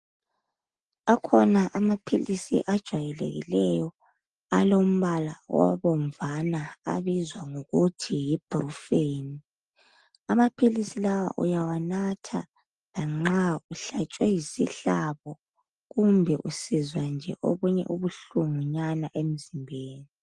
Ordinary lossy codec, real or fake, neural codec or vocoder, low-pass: Opus, 24 kbps; real; none; 10.8 kHz